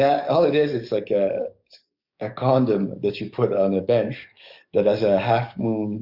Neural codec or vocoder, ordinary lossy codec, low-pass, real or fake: codec, 44.1 kHz, 7.8 kbps, DAC; Opus, 64 kbps; 5.4 kHz; fake